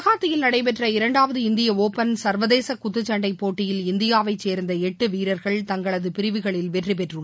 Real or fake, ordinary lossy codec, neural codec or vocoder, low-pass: real; none; none; none